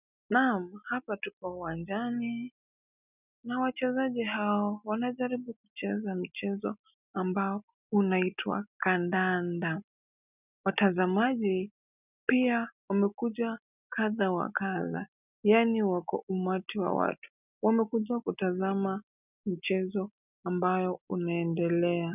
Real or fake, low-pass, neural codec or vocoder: real; 3.6 kHz; none